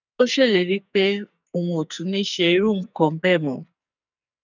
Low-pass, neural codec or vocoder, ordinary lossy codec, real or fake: 7.2 kHz; codec, 44.1 kHz, 2.6 kbps, SNAC; none; fake